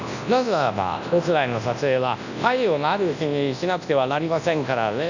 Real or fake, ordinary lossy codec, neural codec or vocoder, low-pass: fake; none; codec, 24 kHz, 0.9 kbps, WavTokenizer, large speech release; 7.2 kHz